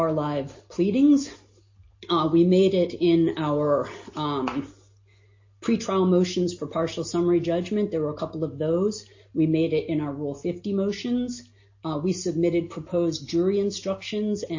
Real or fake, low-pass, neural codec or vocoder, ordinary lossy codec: real; 7.2 kHz; none; MP3, 32 kbps